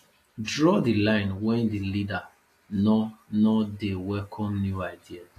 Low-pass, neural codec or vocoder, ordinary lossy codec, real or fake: 14.4 kHz; none; AAC, 48 kbps; real